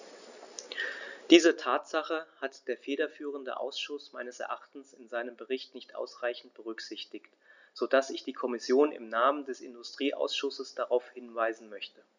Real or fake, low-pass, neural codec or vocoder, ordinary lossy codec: real; 7.2 kHz; none; none